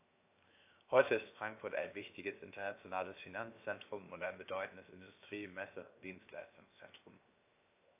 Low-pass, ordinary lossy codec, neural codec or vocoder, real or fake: 3.6 kHz; AAC, 24 kbps; codec, 16 kHz, 0.7 kbps, FocalCodec; fake